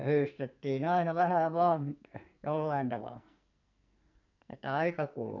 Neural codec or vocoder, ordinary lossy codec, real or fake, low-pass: codec, 44.1 kHz, 2.6 kbps, SNAC; none; fake; 7.2 kHz